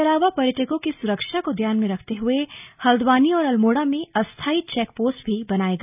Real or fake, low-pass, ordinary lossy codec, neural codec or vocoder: real; 3.6 kHz; none; none